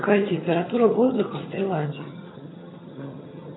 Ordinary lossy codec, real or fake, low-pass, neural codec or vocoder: AAC, 16 kbps; fake; 7.2 kHz; vocoder, 22.05 kHz, 80 mel bands, HiFi-GAN